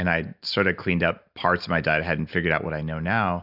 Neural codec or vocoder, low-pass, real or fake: none; 5.4 kHz; real